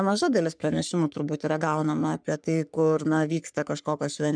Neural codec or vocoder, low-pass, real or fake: codec, 44.1 kHz, 3.4 kbps, Pupu-Codec; 9.9 kHz; fake